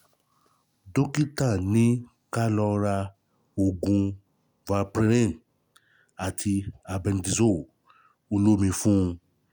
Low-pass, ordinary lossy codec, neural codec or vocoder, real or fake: none; none; none; real